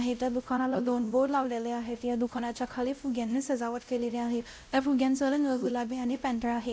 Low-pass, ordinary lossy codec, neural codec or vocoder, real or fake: none; none; codec, 16 kHz, 0.5 kbps, X-Codec, WavLM features, trained on Multilingual LibriSpeech; fake